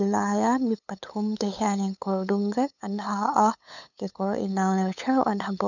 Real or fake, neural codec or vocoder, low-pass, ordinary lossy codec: fake; codec, 16 kHz, 8 kbps, FunCodec, trained on LibriTTS, 25 frames a second; 7.2 kHz; none